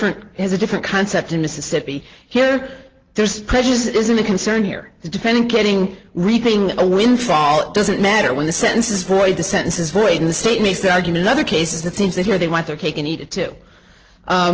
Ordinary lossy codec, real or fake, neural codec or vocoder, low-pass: Opus, 16 kbps; real; none; 7.2 kHz